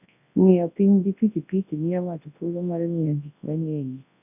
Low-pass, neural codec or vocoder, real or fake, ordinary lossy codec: 3.6 kHz; codec, 24 kHz, 0.9 kbps, WavTokenizer, large speech release; fake; none